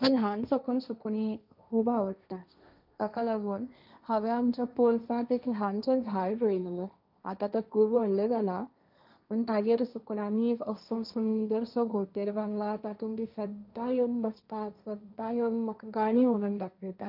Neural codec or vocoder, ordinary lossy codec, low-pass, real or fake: codec, 16 kHz, 1.1 kbps, Voila-Tokenizer; none; 5.4 kHz; fake